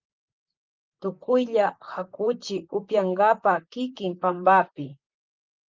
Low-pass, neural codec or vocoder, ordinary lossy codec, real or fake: 7.2 kHz; vocoder, 44.1 kHz, 128 mel bands, Pupu-Vocoder; Opus, 24 kbps; fake